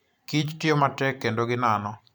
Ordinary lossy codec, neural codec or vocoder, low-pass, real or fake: none; vocoder, 44.1 kHz, 128 mel bands every 512 samples, BigVGAN v2; none; fake